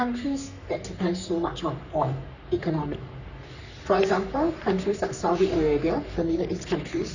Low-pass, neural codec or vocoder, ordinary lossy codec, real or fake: 7.2 kHz; codec, 44.1 kHz, 3.4 kbps, Pupu-Codec; none; fake